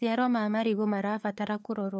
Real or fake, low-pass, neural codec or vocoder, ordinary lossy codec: fake; none; codec, 16 kHz, 4 kbps, FunCodec, trained on LibriTTS, 50 frames a second; none